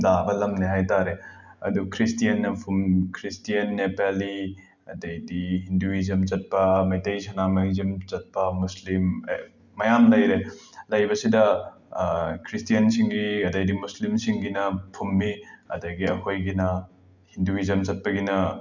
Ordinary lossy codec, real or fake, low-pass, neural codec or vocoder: none; real; 7.2 kHz; none